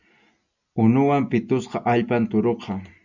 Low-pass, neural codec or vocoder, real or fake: 7.2 kHz; none; real